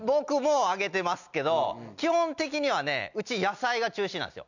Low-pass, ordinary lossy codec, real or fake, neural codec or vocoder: 7.2 kHz; none; real; none